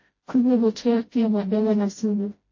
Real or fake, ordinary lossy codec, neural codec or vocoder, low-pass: fake; MP3, 32 kbps; codec, 16 kHz, 0.5 kbps, FreqCodec, smaller model; 7.2 kHz